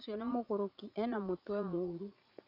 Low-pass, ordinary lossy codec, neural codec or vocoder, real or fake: 5.4 kHz; none; vocoder, 44.1 kHz, 80 mel bands, Vocos; fake